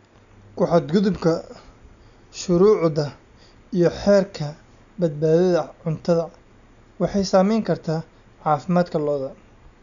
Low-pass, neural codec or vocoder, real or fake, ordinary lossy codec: 7.2 kHz; none; real; none